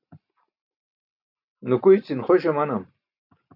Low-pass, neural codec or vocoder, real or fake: 5.4 kHz; none; real